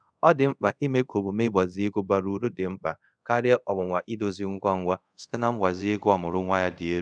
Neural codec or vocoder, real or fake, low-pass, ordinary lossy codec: codec, 24 kHz, 0.5 kbps, DualCodec; fake; 9.9 kHz; none